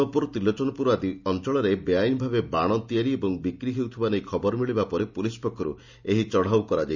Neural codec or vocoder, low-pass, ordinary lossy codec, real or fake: none; 7.2 kHz; none; real